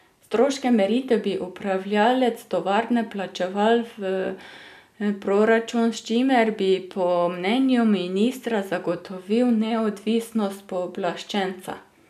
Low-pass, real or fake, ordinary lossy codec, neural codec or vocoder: 14.4 kHz; real; none; none